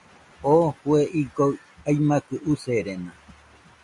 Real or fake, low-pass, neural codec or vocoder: real; 10.8 kHz; none